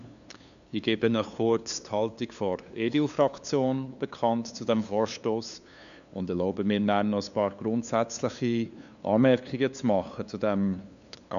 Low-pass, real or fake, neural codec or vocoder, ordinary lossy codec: 7.2 kHz; fake; codec, 16 kHz, 2 kbps, FunCodec, trained on LibriTTS, 25 frames a second; none